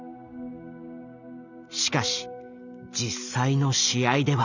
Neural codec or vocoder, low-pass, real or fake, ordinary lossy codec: none; 7.2 kHz; real; none